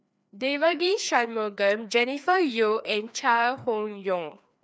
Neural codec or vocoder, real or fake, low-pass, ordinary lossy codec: codec, 16 kHz, 2 kbps, FreqCodec, larger model; fake; none; none